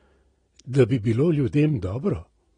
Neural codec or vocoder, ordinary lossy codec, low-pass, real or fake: none; AAC, 32 kbps; 9.9 kHz; real